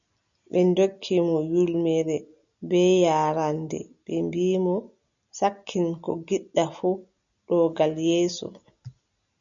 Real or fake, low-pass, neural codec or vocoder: real; 7.2 kHz; none